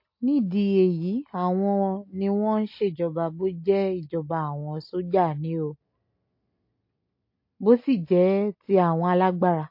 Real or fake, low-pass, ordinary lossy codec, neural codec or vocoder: real; 5.4 kHz; MP3, 32 kbps; none